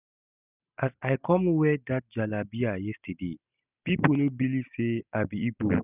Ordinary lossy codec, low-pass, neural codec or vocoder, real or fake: none; 3.6 kHz; none; real